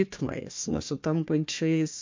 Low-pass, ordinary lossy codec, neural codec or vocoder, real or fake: 7.2 kHz; MP3, 48 kbps; codec, 16 kHz, 1 kbps, FunCodec, trained on Chinese and English, 50 frames a second; fake